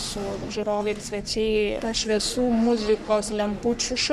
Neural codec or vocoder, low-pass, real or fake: codec, 44.1 kHz, 3.4 kbps, Pupu-Codec; 14.4 kHz; fake